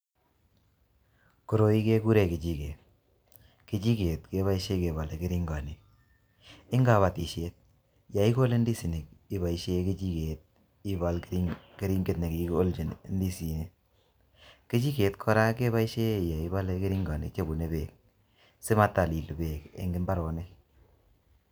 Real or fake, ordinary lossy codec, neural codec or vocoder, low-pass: real; none; none; none